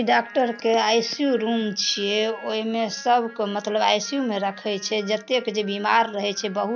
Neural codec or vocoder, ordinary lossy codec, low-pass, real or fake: none; none; 7.2 kHz; real